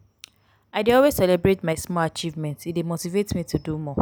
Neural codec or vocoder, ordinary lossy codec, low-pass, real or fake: none; none; none; real